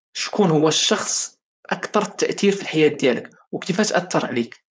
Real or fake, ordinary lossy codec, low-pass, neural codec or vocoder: fake; none; none; codec, 16 kHz, 4.8 kbps, FACodec